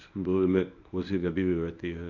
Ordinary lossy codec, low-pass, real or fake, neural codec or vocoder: none; 7.2 kHz; fake; codec, 24 kHz, 0.9 kbps, WavTokenizer, medium speech release version 1